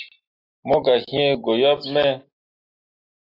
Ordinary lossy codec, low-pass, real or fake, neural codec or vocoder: AAC, 32 kbps; 5.4 kHz; real; none